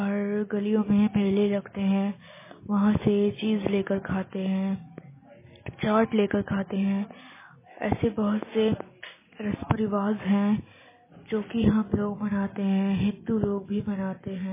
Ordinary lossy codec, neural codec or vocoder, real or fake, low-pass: MP3, 16 kbps; none; real; 3.6 kHz